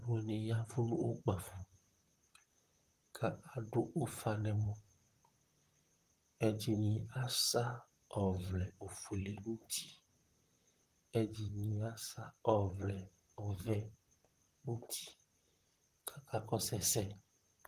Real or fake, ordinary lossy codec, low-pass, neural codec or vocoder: fake; Opus, 24 kbps; 14.4 kHz; vocoder, 44.1 kHz, 128 mel bands, Pupu-Vocoder